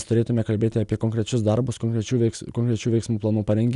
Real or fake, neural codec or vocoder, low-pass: real; none; 10.8 kHz